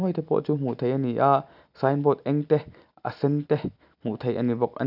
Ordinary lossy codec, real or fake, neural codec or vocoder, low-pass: none; real; none; 5.4 kHz